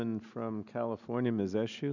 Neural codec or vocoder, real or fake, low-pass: none; real; 7.2 kHz